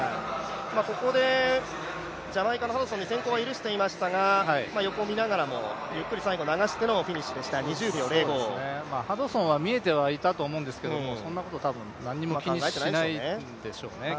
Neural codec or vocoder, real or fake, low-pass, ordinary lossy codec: none; real; none; none